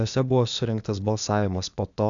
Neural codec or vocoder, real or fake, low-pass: codec, 16 kHz, 0.8 kbps, ZipCodec; fake; 7.2 kHz